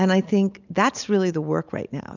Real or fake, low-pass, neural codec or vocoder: real; 7.2 kHz; none